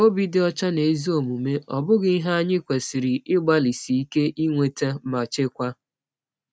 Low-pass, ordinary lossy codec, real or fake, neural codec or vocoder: none; none; real; none